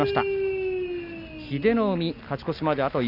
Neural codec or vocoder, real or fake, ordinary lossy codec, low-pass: none; real; none; 5.4 kHz